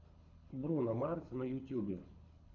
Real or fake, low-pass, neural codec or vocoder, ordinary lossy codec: fake; 7.2 kHz; codec, 24 kHz, 6 kbps, HILCodec; MP3, 64 kbps